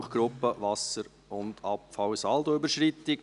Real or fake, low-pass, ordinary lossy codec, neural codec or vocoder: real; 10.8 kHz; none; none